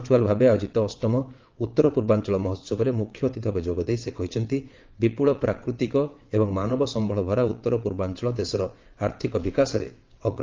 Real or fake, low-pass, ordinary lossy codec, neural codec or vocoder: fake; 7.2 kHz; Opus, 24 kbps; vocoder, 22.05 kHz, 80 mel bands, WaveNeXt